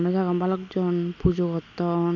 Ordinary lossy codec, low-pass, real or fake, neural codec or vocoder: none; 7.2 kHz; real; none